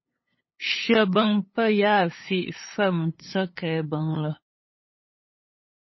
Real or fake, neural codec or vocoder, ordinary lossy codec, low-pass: fake; codec, 16 kHz, 8 kbps, FunCodec, trained on LibriTTS, 25 frames a second; MP3, 24 kbps; 7.2 kHz